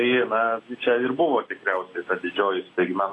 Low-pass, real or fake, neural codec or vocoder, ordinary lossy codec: 10.8 kHz; real; none; AAC, 32 kbps